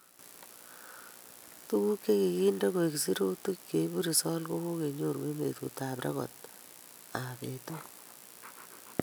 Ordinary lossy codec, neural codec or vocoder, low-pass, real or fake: none; none; none; real